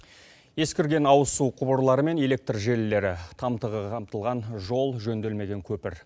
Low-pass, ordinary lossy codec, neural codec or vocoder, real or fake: none; none; none; real